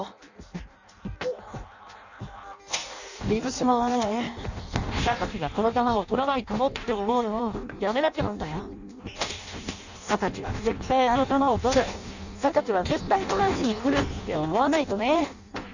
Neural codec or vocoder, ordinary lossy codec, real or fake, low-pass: codec, 16 kHz in and 24 kHz out, 0.6 kbps, FireRedTTS-2 codec; none; fake; 7.2 kHz